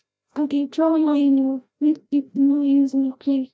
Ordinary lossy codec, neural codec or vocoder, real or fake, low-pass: none; codec, 16 kHz, 0.5 kbps, FreqCodec, larger model; fake; none